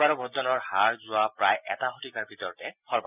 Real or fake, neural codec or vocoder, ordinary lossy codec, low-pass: real; none; none; 3.6 kHz